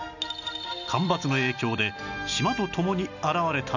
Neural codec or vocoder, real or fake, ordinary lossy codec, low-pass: none; real; none; 7.2 kHz